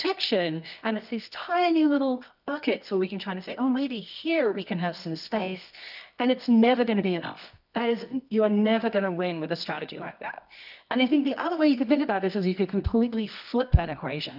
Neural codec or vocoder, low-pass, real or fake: codec, 24 kHz, 0.9 kbps, WavTokenizer, medium music audio release; 5.4 kHz; fake